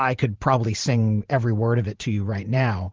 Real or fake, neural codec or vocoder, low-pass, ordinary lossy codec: fake; vocoder, 44.1 kHz, 128 mel bands every 512 samples, BigVGAN v2; 7.2 kHz; Opus, 16 kbps